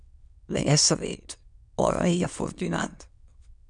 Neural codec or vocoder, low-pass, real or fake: autoencoder, 22.05 kHz, a latent of 192 numbers a frame, VITS, trained on many speakers; 9.9 kHz; fake